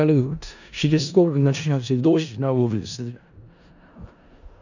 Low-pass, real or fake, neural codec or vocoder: 7.2 kHz; fake; codec, 16 kHz in and 24 kHz out, 0.4 kbps, LongCat-Audio-Codec, four codebook decoder